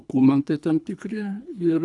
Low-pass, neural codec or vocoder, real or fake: 10.8 kHz; codec, 24 kHz, 3 kbps, HILCodec; fake